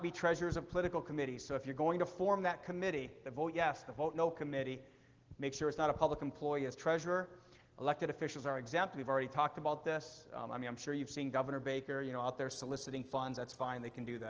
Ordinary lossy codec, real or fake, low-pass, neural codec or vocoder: Opus, 16 kbps; real; 7.2 kHz; none